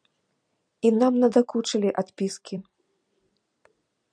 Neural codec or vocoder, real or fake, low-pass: vocoder, 44.1 kHz, 128 mel bands every 256 samples, BigVGAN v2; fake; 9.9 kHz